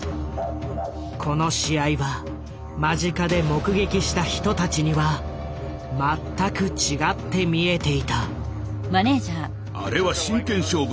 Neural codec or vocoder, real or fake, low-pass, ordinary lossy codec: none; real; none; none